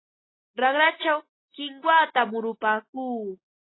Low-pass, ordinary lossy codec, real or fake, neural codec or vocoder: 7.2 kHz; AAC, 16 kbps; real; none